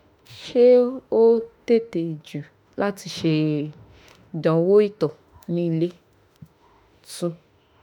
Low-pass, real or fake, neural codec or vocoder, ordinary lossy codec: 19.8 kHz; fake; autoencoder, 48 kHz, 32 numbers a frame, DAC-VAE, trained on Japanese speech; none